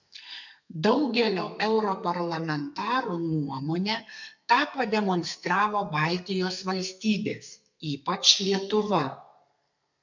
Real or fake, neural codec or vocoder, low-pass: fake; codec, 32 kHz, 1.9 kbps, SNAC; 7.2 kHz